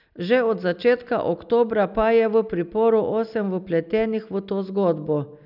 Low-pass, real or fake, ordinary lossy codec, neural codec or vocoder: 5.4 kHz; real; none; none